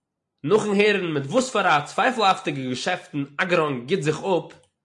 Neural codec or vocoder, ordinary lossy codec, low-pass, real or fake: none; MP3, 48 kbps; 10.8 kHz; real